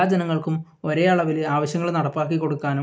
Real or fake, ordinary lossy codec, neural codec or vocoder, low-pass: real; none; none; none